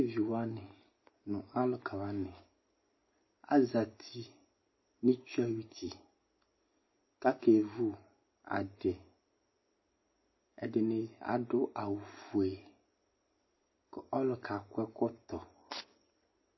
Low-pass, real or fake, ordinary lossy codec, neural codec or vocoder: 7.2 kHz; real; MP3, 24 kbps; none